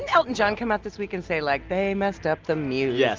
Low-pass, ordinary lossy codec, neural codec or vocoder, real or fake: 7.2 kHz; Opus, 24 kbps; none; real